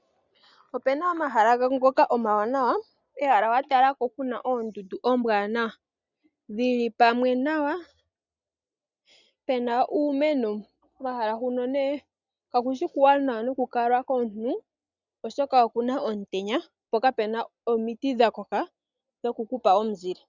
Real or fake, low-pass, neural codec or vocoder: real; 7.2 kHz; none